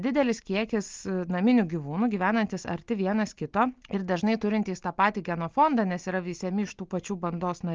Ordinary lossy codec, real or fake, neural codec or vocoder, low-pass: Opus, 24 kbps; real; none; 7.2 kHz